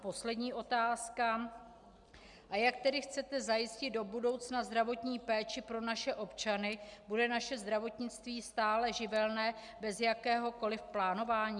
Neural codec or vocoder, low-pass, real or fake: none; 10.8 kHz; real